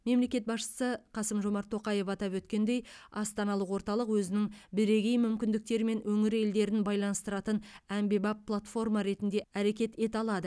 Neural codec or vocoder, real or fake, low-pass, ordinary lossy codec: none; real; none; none